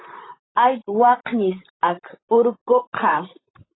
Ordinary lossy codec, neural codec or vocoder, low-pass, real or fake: AAC, 16 kbps; vocoder, 44.1 kHz, 128 mel bands, Pupu-Vocoder; 7.2 kHz; fake